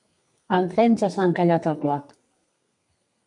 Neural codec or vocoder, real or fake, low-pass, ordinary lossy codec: codec, 32 kHz, 1.9 kbps, SNAC; fake; 10.8 kHz; MP3, 96 kbps